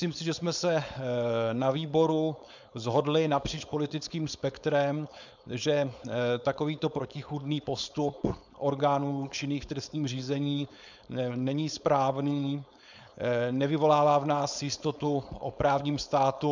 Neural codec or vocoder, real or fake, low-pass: codec, 16 kHz, 4.8 kbps, FACodec; fake; 7.2 kHz